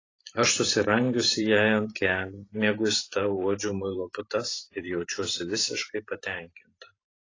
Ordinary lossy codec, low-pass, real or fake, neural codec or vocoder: AAC, 32 kbps; 7.2 kHz; fake; vocoder, 44.1 kHz, 128 mel bands every 512 samples, BigVGAN v2